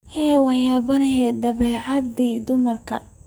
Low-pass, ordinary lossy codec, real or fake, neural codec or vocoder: none; none; fake; codec, 44.1 kHz, 2.6 kbps, SNAC